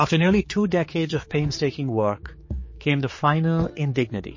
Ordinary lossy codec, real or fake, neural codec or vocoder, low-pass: MP3, 32 kbps; fake; codec, 16 kHz, 4 kbps, X-Codec, HuBERT features, trained on general audio; 7.2 kHz